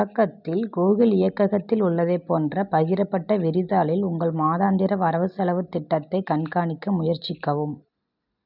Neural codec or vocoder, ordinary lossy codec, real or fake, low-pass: none; none; real; 5.4 kHz